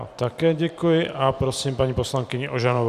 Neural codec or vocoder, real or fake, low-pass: vocoder, 44.1 kHz, 128 mel bands every 512 samples, BigVGAN v2; fake; 14.4 kHz